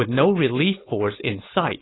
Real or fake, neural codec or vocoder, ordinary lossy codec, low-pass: fake; codec, 16 kHz, 4.8 kbps, FACodec; AAC, 16 kbps; 7.2 kHz